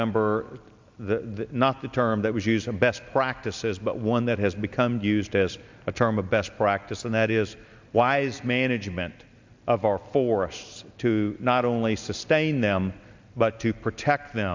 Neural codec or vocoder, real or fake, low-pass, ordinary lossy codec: none; real; 7.2 kHz; MP3, 64 kbps